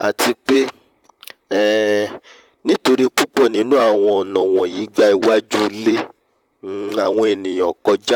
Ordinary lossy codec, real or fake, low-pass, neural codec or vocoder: none; fake; 19.8 kHz; vocoder, 44.1 kHz, 128 mel bands, Pupu-Vocoder